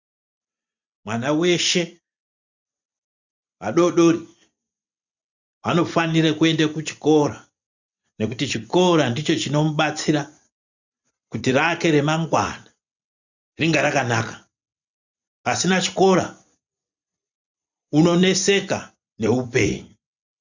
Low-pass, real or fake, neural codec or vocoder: 7.2 kHz; fake; vocoder, 44.1 kHz, 128 mel bands every 256 samples, BigVGAN v2